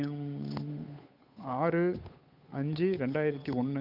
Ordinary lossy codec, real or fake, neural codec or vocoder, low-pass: none; fake; codec, 16 kHz, 8 kbps, FunCodec, trained on Chinese and English, 25 frames a second; 5.4 kHz